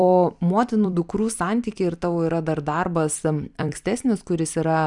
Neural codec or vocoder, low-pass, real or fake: vocoder, 44.1 kHz, 128 mel bands every 256 samples, BigVGAN v2; 10.8 kHz; fake